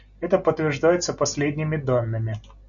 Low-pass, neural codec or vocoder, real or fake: 7.2 kHz; none; real